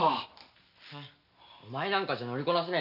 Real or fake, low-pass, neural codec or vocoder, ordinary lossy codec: real; 5.4 kHz; none; none